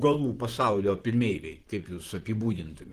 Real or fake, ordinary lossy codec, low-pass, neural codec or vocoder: fake; Opus, 16 kbps; 14.4 kHz; vocoder, 44.1 kHz, 128 mel bands, Pupu-Vocoder